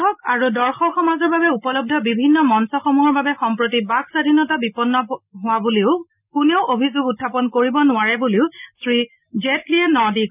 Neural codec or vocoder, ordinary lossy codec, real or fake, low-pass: none; none; real; 3.6 kHz